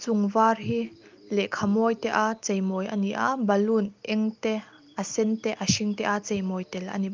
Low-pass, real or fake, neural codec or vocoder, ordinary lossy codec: 7.2 kHz; real; none; Opus, 32 kbps